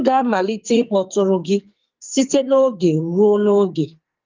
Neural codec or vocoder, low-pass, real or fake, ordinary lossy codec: codec, 44.1 kHz, 2.6 kbps, SNAC; 7.2 kHz; fake; Opus, 24 kbps